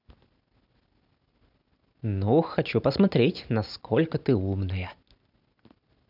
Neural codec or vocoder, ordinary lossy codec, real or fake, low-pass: none; none; real; 5.4 kHz